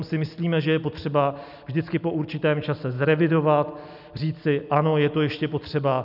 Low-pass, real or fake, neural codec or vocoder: 5.4 kHz; real; none